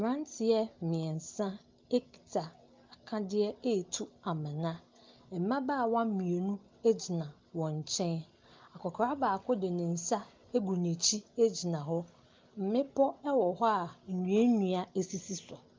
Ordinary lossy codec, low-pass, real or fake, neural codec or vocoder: Opus, 32 kbps; 7.2 kHz; real; none